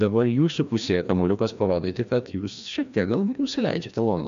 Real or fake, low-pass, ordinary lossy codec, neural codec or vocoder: fake; 7.2 kHz; MP3, 64 kbps; codec, 16 kHz, 1 kbps, FreqCodec, larger model